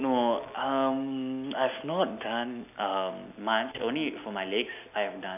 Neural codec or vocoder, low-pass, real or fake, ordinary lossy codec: none; 3.6 kHz; real; none